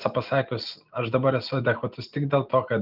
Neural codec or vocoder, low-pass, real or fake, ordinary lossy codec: none; 5.4 kHz; real; Opus, 32 kbps